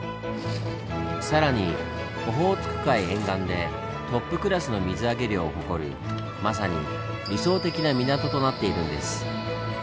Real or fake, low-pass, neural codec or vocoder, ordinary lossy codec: real; none; none; none